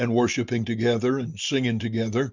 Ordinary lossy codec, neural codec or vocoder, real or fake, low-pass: Opus, 64 kbps; none; real; 7.2 kHz